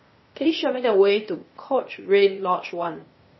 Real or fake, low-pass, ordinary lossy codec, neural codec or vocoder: fake; 7.2 kHz; MP3, 24 kbps; codec, 16 kHz, 0.8 kbps, ZipCodec